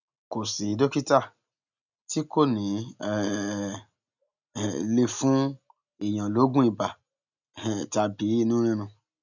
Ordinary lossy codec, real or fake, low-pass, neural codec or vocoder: none; real; 7.2 kHz; none